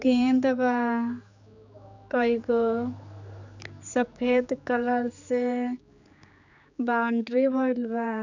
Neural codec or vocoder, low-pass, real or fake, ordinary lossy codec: codec, 16 kHz, 4 kbps, X-Codec, HuBERT features, trained on general audio; 7.2 kHz; fake; none